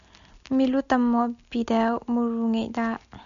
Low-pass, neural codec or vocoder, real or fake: 7.2 kHz; none; real